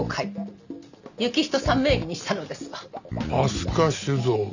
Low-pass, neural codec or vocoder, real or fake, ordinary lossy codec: 7.2 kHz; none; real; none